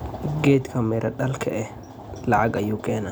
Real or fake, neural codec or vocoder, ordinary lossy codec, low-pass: real; none; none; none